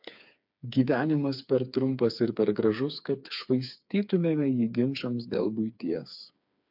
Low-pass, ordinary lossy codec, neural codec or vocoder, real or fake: 5.4 kHz; MP3, 48 kbps; codec, 16 kHz, 4 kbps, FreqCodec, smaller model; fake